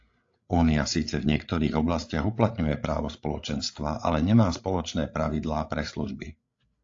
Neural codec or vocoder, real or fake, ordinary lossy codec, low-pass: codec, 16 kHz, 8 kbps, FreqCodec, larger model; fake; AAC, 48 kbps; 7.2 kHz